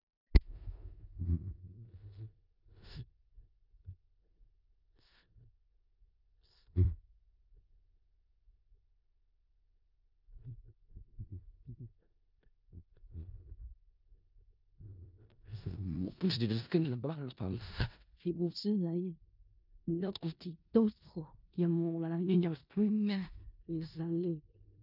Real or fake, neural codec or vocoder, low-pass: fake; codec, 16 kHz in and 24 kHz out, 0.4 kbps, LongCat-Audio-Codec, four codebook decoder; 5.4 kHz